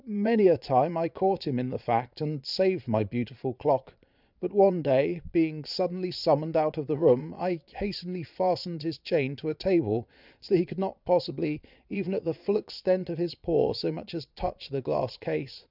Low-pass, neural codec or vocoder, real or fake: 5.4 kHz; vocoder, 22.05 kHz, 80 mel bands, Vocos; fake